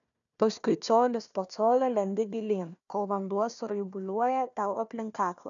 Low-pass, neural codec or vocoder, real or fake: 7.2 kHz; codec, 16 kHz, 1 kbps, FunCodec, trained on Chinese and English, 50 frames a second; fake